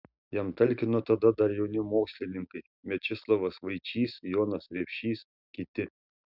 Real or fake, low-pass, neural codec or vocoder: real; 5.4 kHz; none